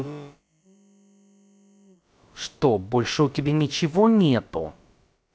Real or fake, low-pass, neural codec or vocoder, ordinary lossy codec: fake; none; codec, 16 kHz, about 1 kbps, DyCAST, with the encoder's durations; none